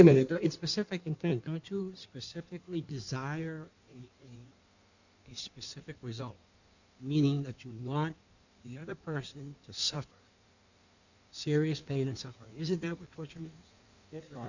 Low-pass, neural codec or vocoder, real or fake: 7.2 kHz; codec, 16 kHz in and 24 kHz out, 1.1 kbps, FireRedTTS-2 codec; fake